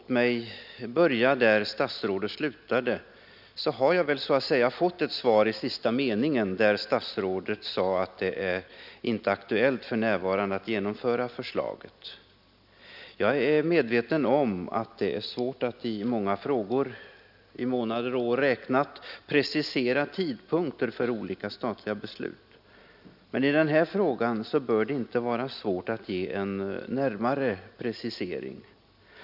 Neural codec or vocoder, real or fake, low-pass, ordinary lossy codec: none; real; 5.4 kHz; none